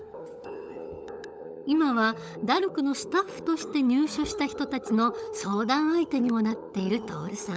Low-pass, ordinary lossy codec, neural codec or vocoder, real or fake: none; none; codec, 16 kHz, 16 kbps, FunCodec, trained on LibriTTS, 50 frames a second; fake